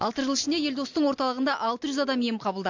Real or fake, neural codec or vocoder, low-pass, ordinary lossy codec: real; none; 7.2 kHz; MP3, 48 kbps